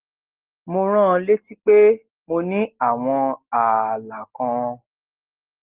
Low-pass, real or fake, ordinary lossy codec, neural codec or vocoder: 3.6 kHz; real; Opus, 16 kbps; none